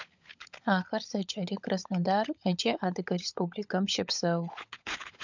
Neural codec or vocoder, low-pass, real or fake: codec, 16 kHz, 16 kbps, FunCodec, trained on LibriTTS, 50 frames a second; 7.2 kHz; fake